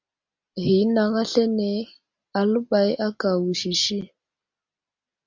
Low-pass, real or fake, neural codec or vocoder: 7.2 kHz; real; none